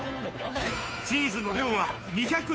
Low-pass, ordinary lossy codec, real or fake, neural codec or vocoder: none; none; fake; codec, 16 kHz, 2 kbps, FunCodec, trained on Chinese and English, 25 frames a second